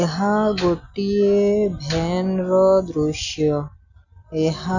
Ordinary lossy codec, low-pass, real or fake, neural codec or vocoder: none; 7.2 kHz; real; none